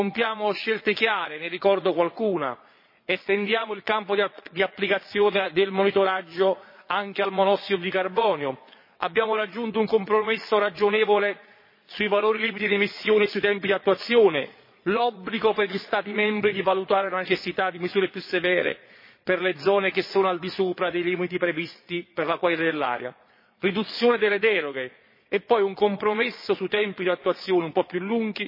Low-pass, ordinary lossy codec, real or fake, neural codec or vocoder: 5.4 kHz; MP3, 24 kbps; fake; vocoder, 22.05 kHz, 80 mel bands, Vocos